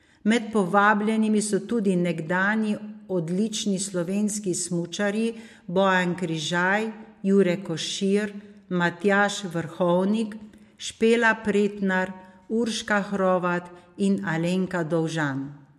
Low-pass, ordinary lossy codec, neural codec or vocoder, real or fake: 14.4 kHz; MP3, 64 kbps; none; real